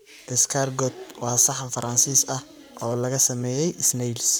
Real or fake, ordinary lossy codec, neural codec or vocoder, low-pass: fake; none; codec, 44.1 kHz, 7.8 kbps, Pupu-Codec; none